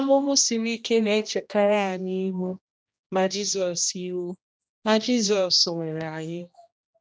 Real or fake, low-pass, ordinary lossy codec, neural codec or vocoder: fake; none; none; codec, 16 kHz, 1 kbps, X-Codec, HuBERT features, trained on general audio